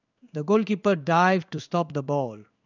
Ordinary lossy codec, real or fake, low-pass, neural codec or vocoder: none; fake; 7.2 kHz; codec, 16 kHz in and 24 kHz out, 1 kbps, XY-Tokenizer